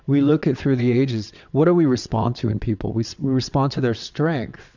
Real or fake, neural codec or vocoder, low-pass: fake; vocoder, 22.05 kHz, 80 mel bands, WaveNeXt; 7.2 kHz